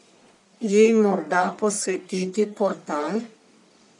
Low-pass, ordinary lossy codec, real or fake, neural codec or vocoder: 10.8 kHz; none; fake; codec, 44.1 kHz, 1.7 kbps, Pupu-Codec